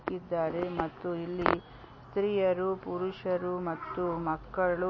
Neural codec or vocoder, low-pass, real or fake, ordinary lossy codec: none; 7.2 kHz; real; MP3, 32 kbps